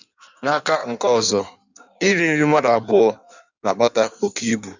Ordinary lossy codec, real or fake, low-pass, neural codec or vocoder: none; fake; 7.2 kHz; codec, 16 kHz in and 24 kHz out, 1.1 kbps, FireRedTTS-2 codec